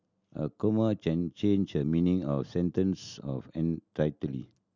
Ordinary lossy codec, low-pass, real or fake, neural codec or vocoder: none; 7.2 kHz; real; none